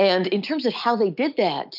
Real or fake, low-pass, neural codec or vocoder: real; 5.4 kHz; none